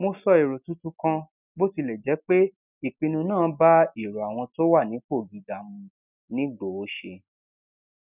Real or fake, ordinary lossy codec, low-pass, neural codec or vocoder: real; none; 3.6 kHz; none